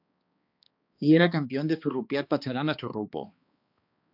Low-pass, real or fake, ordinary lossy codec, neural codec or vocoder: 5.4 kHz; fake; AAC, 48 kbps; codec, 16 kHz, 2 kbps, X-Codec, HuBERT features, trained on balanced general audio